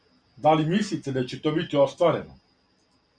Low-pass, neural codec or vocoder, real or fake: 9.9 kHz; none; real